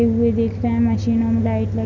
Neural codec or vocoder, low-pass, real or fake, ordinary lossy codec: none; 7.2 kHz; real; Opus, 64 kbps